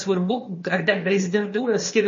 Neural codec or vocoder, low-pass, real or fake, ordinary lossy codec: codec, 16 kHz, 0.8 kbps, ZipCodec; 7.2 kHz; fake; MP3, 32 kbps